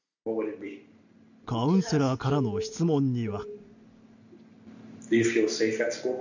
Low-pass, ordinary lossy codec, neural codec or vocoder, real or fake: 7.2 kHz; none; none; real